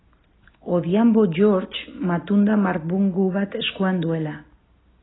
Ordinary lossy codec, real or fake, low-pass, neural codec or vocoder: AAC, 16 kbps; real; 7.2 kHz; none